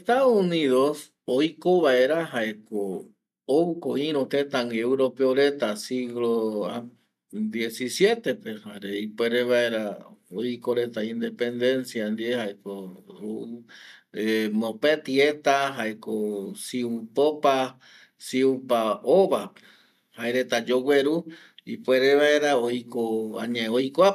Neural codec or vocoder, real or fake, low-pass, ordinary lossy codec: none; real; 14.4 kHz; none